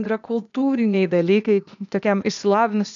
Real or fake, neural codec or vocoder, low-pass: fake; codec, 16 kHz, 0.8 kbps, ZipCodec; 7.2 kHz